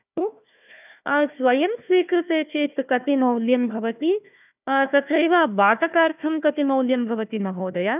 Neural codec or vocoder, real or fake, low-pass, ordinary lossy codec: codec, 16 kHz, 1 kbps, FunCodec, trained on Chinese and English, 50 frames a second; fake; 3.6 kHz; none